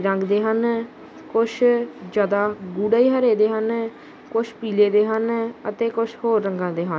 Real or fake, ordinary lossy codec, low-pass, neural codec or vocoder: real; none; none; none